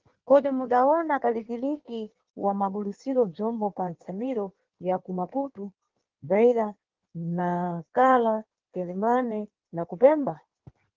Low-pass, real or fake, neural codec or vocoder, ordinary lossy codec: 7.2 kHz; fake; codec, 16 kHz in and 24 kHz out, 1.1 kbps, FireRedTTS-2 codec; Opus, 16 kbps